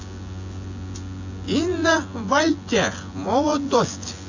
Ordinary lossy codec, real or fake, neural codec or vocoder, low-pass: AAC, 48 kbps; fake; vocoder, 24 kHz, 100 mel bands, Vocos; 7.2 kHz